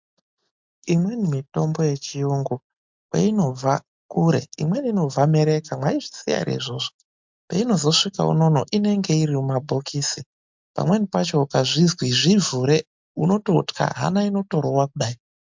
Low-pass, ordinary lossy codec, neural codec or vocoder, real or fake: 7.2 kHz; MP3, 64 kbps; none; real